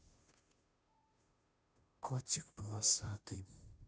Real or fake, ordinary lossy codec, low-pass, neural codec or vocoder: fake; none; none; codec, 16 kHz, 0.5 kbps, FunCodec, trained on Chinese and English, 25 frames a second